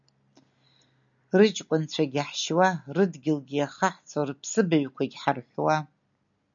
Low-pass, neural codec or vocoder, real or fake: 7.2 kHz; none; real